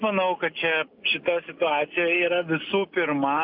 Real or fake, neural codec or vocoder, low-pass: real; none; 5.4 kHz